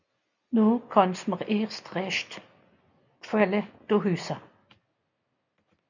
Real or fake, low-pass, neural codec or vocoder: real; 7.2 kHz; none